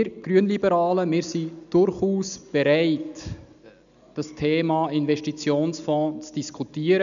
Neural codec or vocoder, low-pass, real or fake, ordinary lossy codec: none; 7.2 kHz; real; none